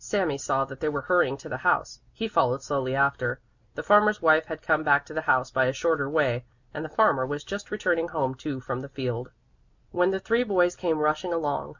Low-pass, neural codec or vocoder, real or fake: 7.2 kHz; none; real